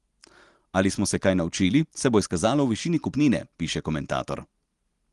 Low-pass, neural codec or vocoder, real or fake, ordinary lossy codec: 10.8 kHz; none; real; Opus, 24 kbps